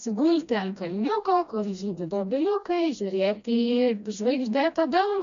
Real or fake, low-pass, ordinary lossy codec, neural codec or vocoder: fake; 7.2 kHz; MP3, 96 kbps; codec, 16 kHz, 1 kbps, FreqCodec, smaller model